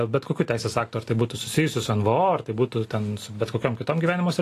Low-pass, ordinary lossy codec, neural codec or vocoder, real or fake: 14.4 kHz; AAC, 48 kbps; none; real